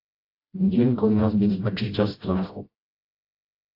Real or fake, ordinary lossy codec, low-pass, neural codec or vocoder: fake; AAC, 32 kbps; 5.4 kHz; codec, 16 kHz, 0.5 kbps, FreqCodec, smaller model